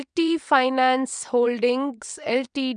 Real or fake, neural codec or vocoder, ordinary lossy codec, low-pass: fake; vocoder, 22.05 kHz, 80 mel bands, WaveNeXt; none; 9.9 kHz